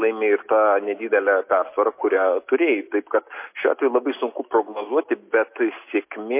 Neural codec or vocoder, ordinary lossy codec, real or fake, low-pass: none; MP3, 24 kbps; real; 3.6 kHz